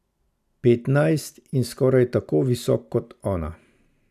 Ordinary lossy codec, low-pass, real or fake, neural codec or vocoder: none; 14.4 kHz; real; none